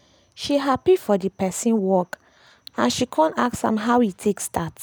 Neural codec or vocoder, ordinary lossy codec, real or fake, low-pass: none; none; real; none